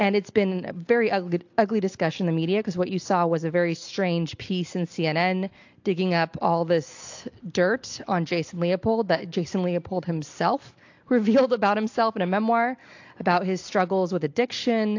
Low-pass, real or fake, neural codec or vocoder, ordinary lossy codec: 7.2 kHz; real; none; AAC, 48 kbps